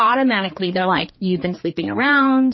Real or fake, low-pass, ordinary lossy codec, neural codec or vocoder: fake; 7.2 kHz; MP3, 24 kbps; codec, 16 kHz, 2 kbps, X-Codec, HuBERT features, trained on general audio